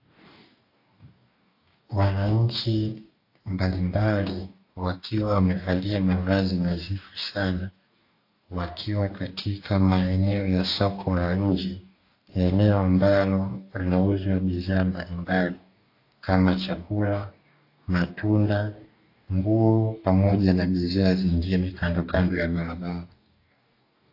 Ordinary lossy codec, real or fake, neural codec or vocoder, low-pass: AAC, 32 kbps; fake; codec, 44.1 kHz, 2.6 kbps, DAC; 5.4 kHz